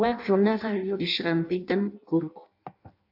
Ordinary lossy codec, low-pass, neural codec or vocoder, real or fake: AAC, 48 kbps; 5.4 kHz; codec, 16 kHz in and 24 kHz out, 0.6 kbps, FireRedTTS-2 codec; fake